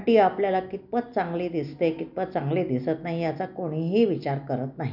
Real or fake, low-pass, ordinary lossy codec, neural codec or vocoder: real; 5.4 kHz; none; none